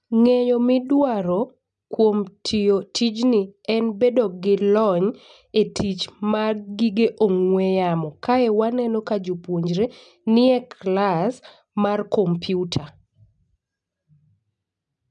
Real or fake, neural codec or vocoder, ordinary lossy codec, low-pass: real; none; none; 9.9 kHz